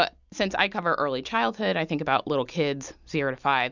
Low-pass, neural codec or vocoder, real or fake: 7.2 kHz; none; real